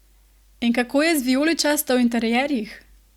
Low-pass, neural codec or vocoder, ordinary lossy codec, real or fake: 19.8 kHz; none; Opus, 64 kbps; real